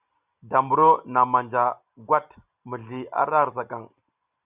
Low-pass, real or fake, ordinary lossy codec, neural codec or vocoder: 3.6 kHz; real; Opus, 64 kbps; none